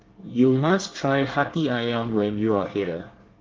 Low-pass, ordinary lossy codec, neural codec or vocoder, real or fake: 7.2 kHz; Opus, 16 kbps; codec, 24 kHz, 1 kbps, SNAC; fake